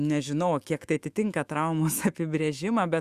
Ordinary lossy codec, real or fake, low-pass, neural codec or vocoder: Opus, 64 kbps; fake; 14.4 kHz; autoencoder, 48 kHz, 128 numbers a frame, DAC-VAE, trained on Japanese speech